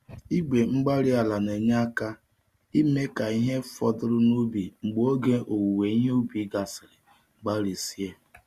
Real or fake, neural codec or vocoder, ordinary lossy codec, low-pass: real; none; Opus, 64 kbps; 14.4 kHz